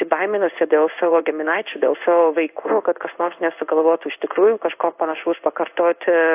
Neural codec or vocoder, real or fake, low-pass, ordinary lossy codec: codec, 16 kHz in and 24 kHz out, 1 kbps, XY-Tokenizer; fake; 3.6 kHz; AAC, 32 kbps